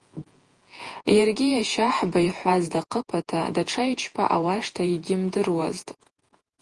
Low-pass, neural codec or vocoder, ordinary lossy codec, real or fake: 10.8 kHz; vocoder, 48 kHz, 128 mel bands, Vocos; Opus, 24 kbps; fake